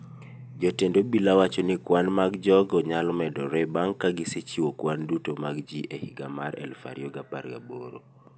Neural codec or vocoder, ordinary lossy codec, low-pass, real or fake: none; none; none; real